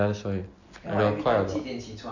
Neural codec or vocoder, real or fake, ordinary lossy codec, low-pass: none; real; none; 7.2 kHz